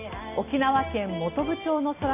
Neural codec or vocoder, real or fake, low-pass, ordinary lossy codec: none; real; 3.6 kHz; none